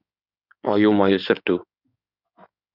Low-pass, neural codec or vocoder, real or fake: 5.4 kHz; codec, 16 kHz in and 24 kHz out, 2.2 kbps, FireRedTTS-2 codec; fake